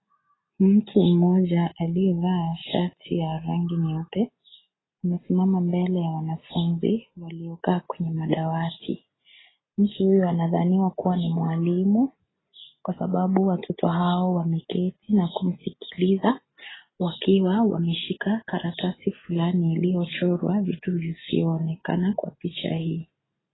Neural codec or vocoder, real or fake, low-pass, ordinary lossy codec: none; real; 7.2 kHz; AAC, 16 kbps